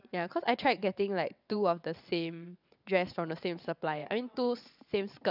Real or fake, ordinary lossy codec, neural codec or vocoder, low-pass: fake; none; vocoder, 22.05 kHz, 80 mel bands, WaveNeXt; 5.4 kHz